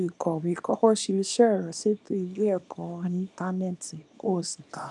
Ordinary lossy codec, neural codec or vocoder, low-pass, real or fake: none; codec, 24 kHz, 0.9 kbps, WavTokenizer, small release; 10.8 kHz; fake